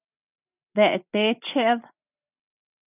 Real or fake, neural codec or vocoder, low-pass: real; none; 3.6 kHz